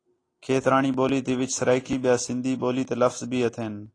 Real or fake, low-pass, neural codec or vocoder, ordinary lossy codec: real; 9.9 kHz; none; AAC, 32 kbps